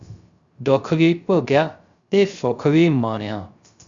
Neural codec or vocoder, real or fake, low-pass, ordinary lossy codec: codec, 16 kHz, 0.3 kbps, FocalCodec; fake; 7.2 kHz; Opus, 64 kbps